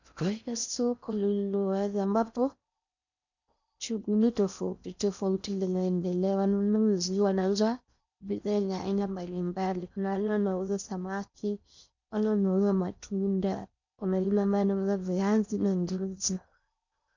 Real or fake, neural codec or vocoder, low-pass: fake; codec, 16 kHz in and 24 kHz out, 0.6 kbps, FocalCodec, streaming, 4096 codes; 7.2 kHz